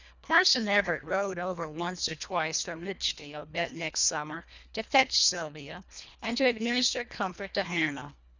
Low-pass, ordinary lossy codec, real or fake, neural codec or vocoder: 7.2 kHz; Opus, 64 kbps; fake; codec, 24 kHz, 1.5 kbps, HILCodec